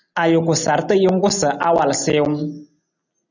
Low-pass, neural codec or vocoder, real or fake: 7.2 kHz; none; real